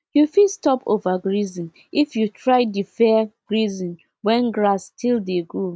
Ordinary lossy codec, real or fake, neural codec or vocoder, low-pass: none; real; none; none